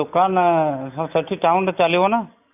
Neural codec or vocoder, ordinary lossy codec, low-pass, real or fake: none; none; 3.6 kHz; real